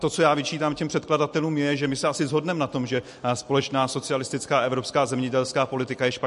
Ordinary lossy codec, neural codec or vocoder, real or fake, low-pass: MP3, 48 kbps; none; real; 14.4 kHz